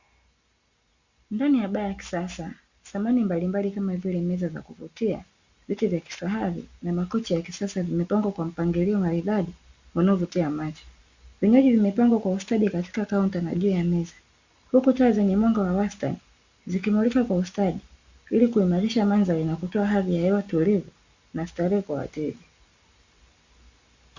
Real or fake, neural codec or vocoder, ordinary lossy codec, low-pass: real; none; Opus, 64 kbps; 7.2 kHz